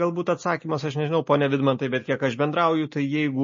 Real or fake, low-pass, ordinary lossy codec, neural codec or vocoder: real; 7.2 kHz; MP3, 32 kbps; none